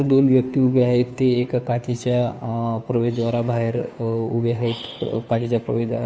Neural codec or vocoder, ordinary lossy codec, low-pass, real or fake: codec, 16 kHz, 2 kbps, FunCodec, trained on Chinese and English, 25 frames a second; none; none; fake